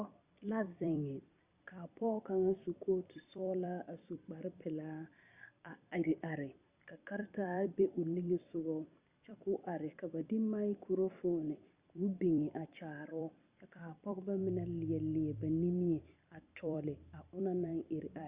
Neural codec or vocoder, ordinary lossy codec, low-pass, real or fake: vocoder, 44.1 kHz, 128 mel bands every 256 samples, BigVGAN v2; Opus, 64 kbps; 3.6 kHz; fake